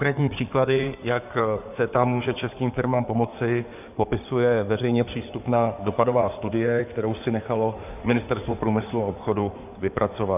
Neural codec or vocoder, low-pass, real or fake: codec, 16 kHz in and 24 kHz out, 2.2 kbps, FireRedTTS-2 codec; 3.6 kHz; fake